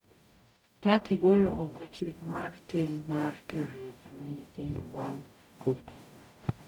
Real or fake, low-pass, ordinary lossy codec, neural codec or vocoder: fake; 19.8 kHz; none; codec, 44.1 kHz, 0.9 kbps, DAC